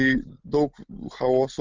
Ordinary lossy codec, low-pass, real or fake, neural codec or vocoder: Opus, 32 kbps; 7.2 kHz; real; none